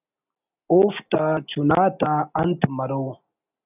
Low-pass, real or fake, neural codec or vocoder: 3.6 kHz; real; none